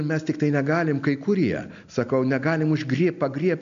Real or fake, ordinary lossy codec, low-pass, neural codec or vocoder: real; MP3, 64 kbps; 7.2 kHz; none